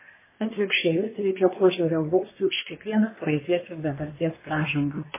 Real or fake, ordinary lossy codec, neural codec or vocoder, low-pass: fake; MP3, 16 kbps; codec, 24 kHz, 1 kbps, SNAC; 3.6 kHz